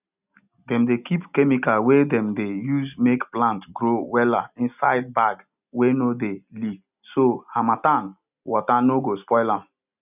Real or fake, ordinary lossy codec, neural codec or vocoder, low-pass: real; none; none; 3.6 kHz